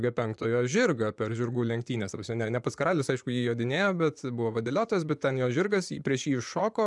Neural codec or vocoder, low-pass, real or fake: vocoder, 24 kHz, 100 mel bands, Vocos; 10.8 kHz; fake